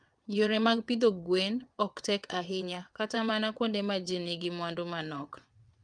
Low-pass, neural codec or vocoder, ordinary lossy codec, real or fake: 9.9 kHz; vocoder, 22.05 kHz, 80 mel bands, WaveNeXt; Opus, 32 kbps; fake